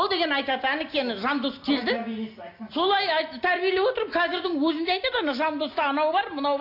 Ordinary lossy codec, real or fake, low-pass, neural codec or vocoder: AAC, 32 kbps; real; 5.4 kHz; none